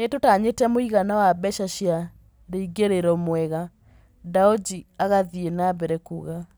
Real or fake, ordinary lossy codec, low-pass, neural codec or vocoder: real; none; none; none